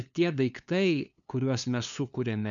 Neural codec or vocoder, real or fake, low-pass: codec, 16 kHz, 2 kbps, FunCodec, trained on LibriTTS, 25 frames a second; fake; 7.2 kHz